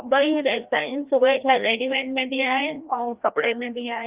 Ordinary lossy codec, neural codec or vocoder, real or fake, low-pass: Opus, 32 kbps; codec, 16 kHz, 1 kbps, FreqCodec, larger model; fake; 3.6 kHz